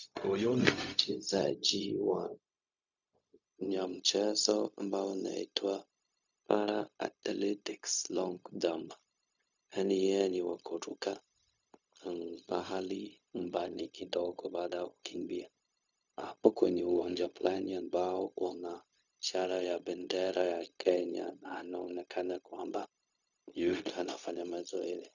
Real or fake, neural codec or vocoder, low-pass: fake; codec, 16 kHz, 0.4 kbps, LongCat-Audio-Codec; 7.2 kHz